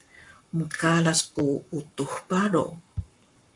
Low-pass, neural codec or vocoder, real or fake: 10.8 kHz; codec, 44.1 kHz, 7.8 kbps, Pupu-Codec; fake